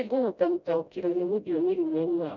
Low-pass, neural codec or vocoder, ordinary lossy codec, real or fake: 7.2 kHz; codec, 16 kHz, 0.5 kbps, FreqCodec, smaller model; none; fake